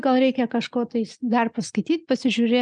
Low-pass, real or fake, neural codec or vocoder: 9.9 kHz; real; none